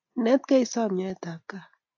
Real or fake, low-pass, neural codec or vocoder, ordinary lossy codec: real; 7.2 kHz; none; AAC, 48 kbps